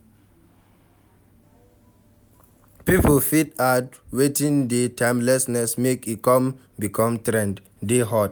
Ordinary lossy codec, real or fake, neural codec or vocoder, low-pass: none; real; none; none